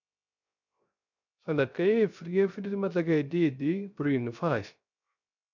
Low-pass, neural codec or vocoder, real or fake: 7.2 kHz; codec, 16 kHz, 0.3 kbps, FocalCodec; fake